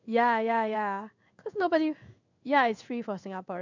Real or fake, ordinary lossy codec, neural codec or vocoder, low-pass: fake; none; codec, 16 kHz in and 24 kHz out, 1 kbps, XY-Tokenizer; 7.2 kHz